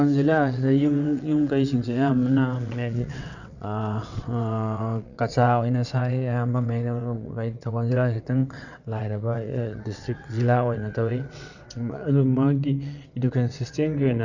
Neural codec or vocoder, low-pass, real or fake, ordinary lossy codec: vocoder, 22.05 kHz, 80 mel bands, WaveNeXt; 7.2 kHz; fake; none